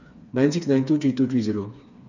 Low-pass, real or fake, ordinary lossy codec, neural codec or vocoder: 7.2 kHz; fake; none; codec, 16 kHz, 4 kbps, FreqCodec, smaller model